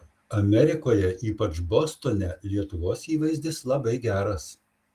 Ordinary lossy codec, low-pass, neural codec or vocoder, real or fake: Opus, 24 kbps; 14.4 kHz; none; real